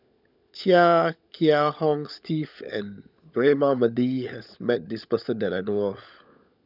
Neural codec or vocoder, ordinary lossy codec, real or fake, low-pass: codec, 16 kHz, 16 kbps, FunCodec, trained on LibriTTS, 50 frames a second; none; fake; 5.4 kHz